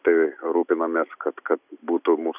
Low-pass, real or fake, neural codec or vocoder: 3.6 kHz; real; none